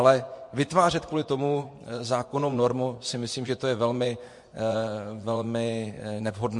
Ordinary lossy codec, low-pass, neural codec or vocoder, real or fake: MP3, 48 kbps; 10.8 kHz; vocoder, 24 kHz, 100 mel bands, Vocos; fake